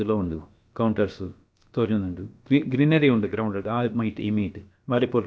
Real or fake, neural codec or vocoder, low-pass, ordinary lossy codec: fake; codec, 16 kHz, about 1 kbps, DyCAST, with the encoder's durations; none; none